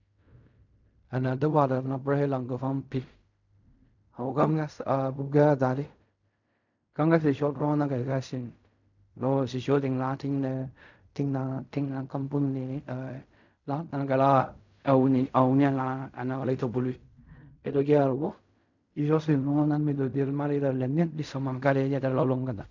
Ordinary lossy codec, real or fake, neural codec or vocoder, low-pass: none; fake; codec, 16 kHz in and 24 kHz out, 0.4 kbps, LongCat-Audio-Codec, fine tuned four codebook decoder; 7.2 kHz